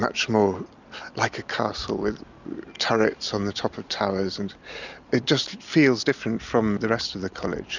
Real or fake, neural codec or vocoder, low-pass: real; none; 7.2 kHz